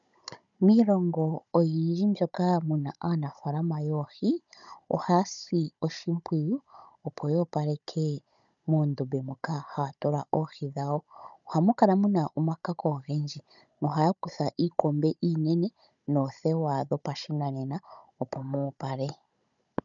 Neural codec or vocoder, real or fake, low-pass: codec, 16 kHz, 16 kbps, FunCodec, trained on Chinese and English, 50 frames a second; fake; 7.2 kHz